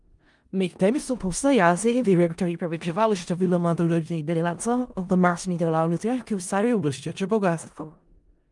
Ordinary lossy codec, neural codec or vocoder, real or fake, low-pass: Opus, 32 kbps; codec, 16 kHz in and 24 kHz out, 0.4 kbps, LongCat-Audio-Codec, four codebook decoder; fake; 10.8 kHz